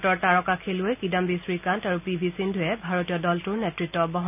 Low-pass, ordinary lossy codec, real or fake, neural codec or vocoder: 3.6 kHz; none; real; none